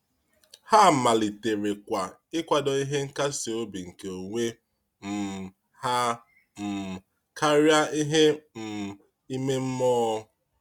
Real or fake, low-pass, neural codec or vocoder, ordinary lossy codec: real; 19.8 kHz; none; Opus, 64 kbps